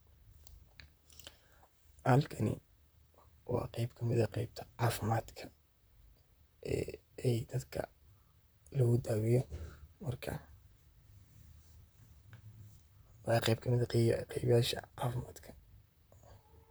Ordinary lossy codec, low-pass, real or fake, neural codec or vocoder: none; none; fake; vocoder, 44.1 kHz, 128 mel bands, Pupu-Vocoder